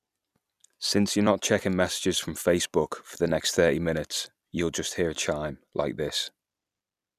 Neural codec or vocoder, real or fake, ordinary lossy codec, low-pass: vocoder, 44.1 kHz, 128 mel bands every 256 samples, BigVGAN v2; fake; none; 14.4 kHz